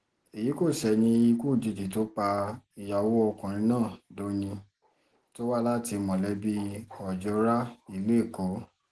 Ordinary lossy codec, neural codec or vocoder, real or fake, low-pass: Opus, 16 kbps; none; real; 10.8 kHz